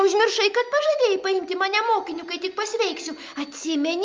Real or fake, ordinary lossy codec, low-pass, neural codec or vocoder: real; Opus, 24 kbps; 7.2 kHz; none